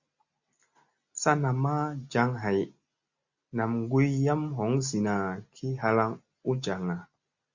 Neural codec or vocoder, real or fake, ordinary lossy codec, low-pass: none; real; Opus, 64 kbps; 7.2 kHz